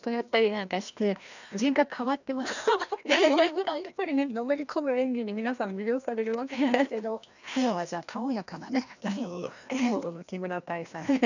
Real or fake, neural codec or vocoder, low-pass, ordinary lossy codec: fake; codec, 16 kHz, 1 kbps, FreqCodec, larger model; 7.2 kHz; none